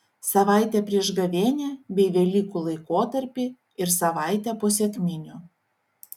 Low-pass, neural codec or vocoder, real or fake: 19.8 kHz; none; real